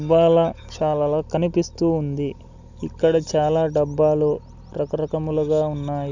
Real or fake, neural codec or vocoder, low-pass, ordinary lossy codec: real; none; 7.2 kHz; none